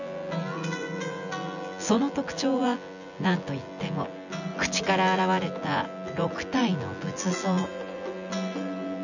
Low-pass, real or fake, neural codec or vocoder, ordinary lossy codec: 7.2 kHz; fake; vocoder, 24 kHz, 100 mel bands, Vocos; none